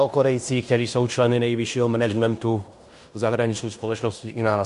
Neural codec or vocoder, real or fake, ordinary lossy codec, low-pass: codec, 16 kHz in and 24 kHz out, 0.9 kbps, LongCat-Audio-Codec, fine tuned four codebook decoder; fake; MP3, 64 kbps; 10.8 kHz